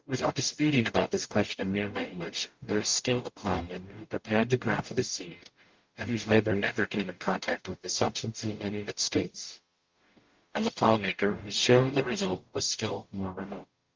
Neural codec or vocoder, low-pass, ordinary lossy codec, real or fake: codec, 44.1 kHz, 0.9 kbps, DAC; 7.2 kHz; Opus, 32 kbps; fake